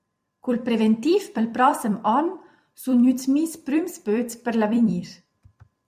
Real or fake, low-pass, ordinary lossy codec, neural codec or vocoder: fake; 14.4 kHz; MP3, 96 kbps; vocoder, 44.1 kHz, 128 mel bands every 256 samples, BigVGAN v2